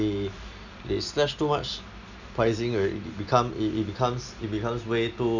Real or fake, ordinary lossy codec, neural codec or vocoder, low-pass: real; none; none; 7.2 kHz